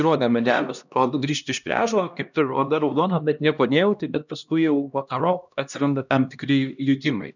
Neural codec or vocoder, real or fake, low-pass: codec, 16 kHz, 1 kbps, X-Codec, HuBERT features, trained on LibriSpeech; fake; 7.2 kHz